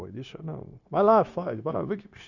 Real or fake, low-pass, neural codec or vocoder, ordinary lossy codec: fake; 7.2 kHz; codec, 16 kHz, 0.9 kbps, LongCat-Audio-Codec; none